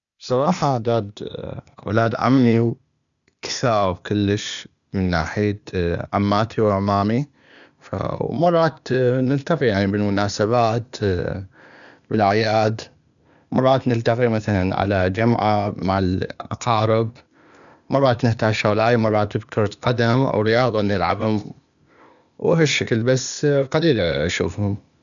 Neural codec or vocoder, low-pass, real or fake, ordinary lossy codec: codec, 16 kHz, 0.8 kbps, ZipCodec; 7.2 kHz; fake; none